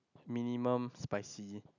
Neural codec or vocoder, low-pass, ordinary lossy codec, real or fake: none; 7.2 kHz; none; real